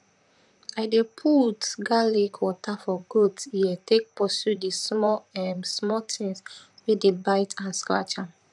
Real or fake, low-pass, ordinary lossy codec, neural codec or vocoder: fake; 10.8 kHz; none; vocoder, 44.1 kHz, 128 mel bands, Pupu-Vocoder